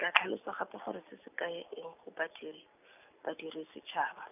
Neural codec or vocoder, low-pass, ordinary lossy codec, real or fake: none; 3.6 kHz; none; real